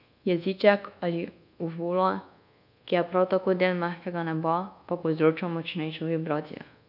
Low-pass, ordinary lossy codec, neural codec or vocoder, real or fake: 5.4 kHz; none; codec, 24 kHz, 1.2 kbps, DualCodec; fake